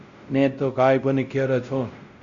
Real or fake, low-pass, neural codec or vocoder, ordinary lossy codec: fake; 7.2 kHz; codec, 16 kHz, 0.5 kbps, X-Codec, WavLM features, trained on Multilingual LibriSpeech; Opus, 64 kbps